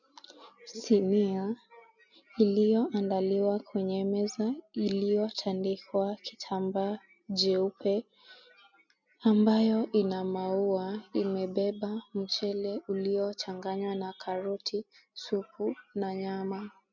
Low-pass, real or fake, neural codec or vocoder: 7.2 kHz; real; none